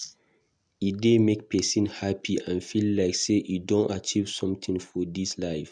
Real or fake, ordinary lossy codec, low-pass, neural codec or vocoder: real; none; 9.9 kHz; none